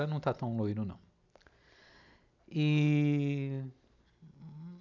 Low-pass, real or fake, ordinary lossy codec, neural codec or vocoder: 7.2 kHz; real; none; none